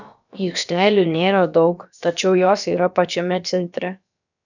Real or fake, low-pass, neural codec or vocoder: fake; 7.2 kHz; codec, 16 kHz, about 1 kbps, DyCAST, with the encoder's durations